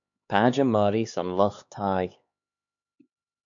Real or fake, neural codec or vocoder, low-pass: fake; codec, 16 kHz, 2 kbps, X-Codec, HuBERT features, trained on LibriSpeech; 7.2 kHz